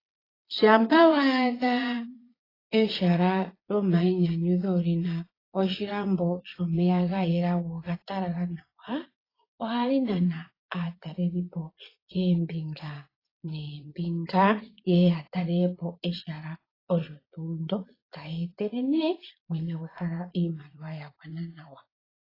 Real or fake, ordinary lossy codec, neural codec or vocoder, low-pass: fake; AAC, 24 kbps; vocoder, 22.05 kHz, 80 mel bands, Vocos; 5.4 kHz